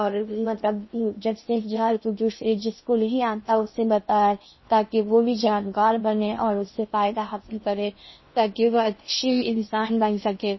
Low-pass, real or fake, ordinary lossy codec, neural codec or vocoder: 7.2 kHz; fake; MP3, 24 kbps; codec, 16 kHz in and 24 kHz out, 0.6 kbps, FocalCodec, streaming, 2048 codes